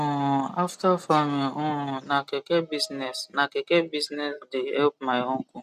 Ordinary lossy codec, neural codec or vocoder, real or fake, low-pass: none; none; real; 14.4 kHz